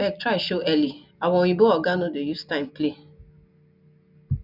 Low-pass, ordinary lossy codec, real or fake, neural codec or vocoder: 5.4 kHz; none; real; none